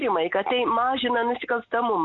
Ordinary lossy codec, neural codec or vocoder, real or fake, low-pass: MP3, 64 kbps; none; real; 7.2 kHz